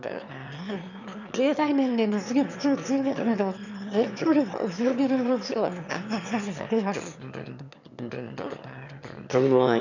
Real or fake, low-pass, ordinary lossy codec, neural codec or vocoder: fake; 7.2 kHz; none; autoencoder, 22.05 kHz, a latent of 192 numbers a frame, VITS, trained on one speaker